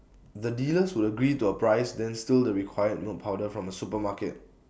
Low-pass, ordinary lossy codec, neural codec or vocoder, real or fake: none; none; none; real